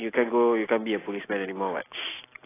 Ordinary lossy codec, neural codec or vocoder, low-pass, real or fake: AAC, 16 kbps; none; 3.6 kHz; real